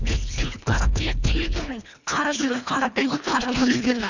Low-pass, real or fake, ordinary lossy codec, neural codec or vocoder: 7.2 kHz; fake; none; codec, 24 kHz, 1.5 kbps, HILCodec